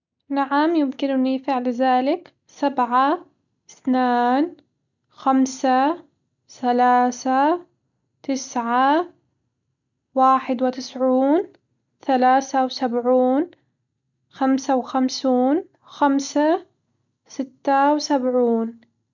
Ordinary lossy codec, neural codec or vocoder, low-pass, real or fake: none; none; 7.2 kHz; real